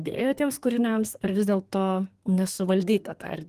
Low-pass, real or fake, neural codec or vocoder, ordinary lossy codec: 14.4 kHz; fake; codec, 32 kHz, 1.9 kbps, SNAC; Opus, 24 kbps